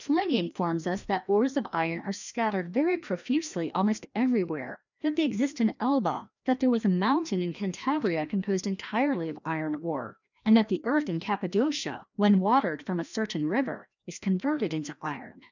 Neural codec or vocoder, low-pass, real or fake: codec, 16 kHz, 1 kbps, FreqCodec, larger model; 7.2 kHz; fake